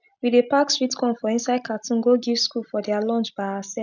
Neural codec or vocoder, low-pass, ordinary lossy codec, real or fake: none; 7.2 kHz; none; real